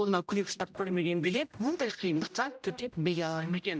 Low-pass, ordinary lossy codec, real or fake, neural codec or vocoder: none; none; fake; codec, 16 kHz, 0.5 kbps, X-Codec, HuBERT features, trained on general audio